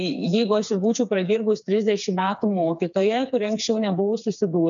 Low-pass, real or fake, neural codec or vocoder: 7.2 kHz; fake; codec, 16 kHz, 4 kbps, FreqCodec, smaller model